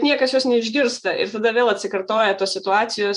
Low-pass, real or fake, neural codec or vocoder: 10.8 kHz; fake; vocoder, 24 kHz, 100 mel bands, Vocos